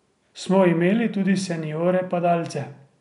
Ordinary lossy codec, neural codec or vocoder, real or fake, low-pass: none; none; real; 10.8 kHz